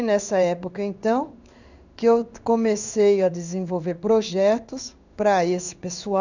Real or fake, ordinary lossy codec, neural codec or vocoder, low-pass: fake; none; codec, 16 kHz in and 24 kHz out, 1 kbps, XY-Tokenizer; 7.2 kHz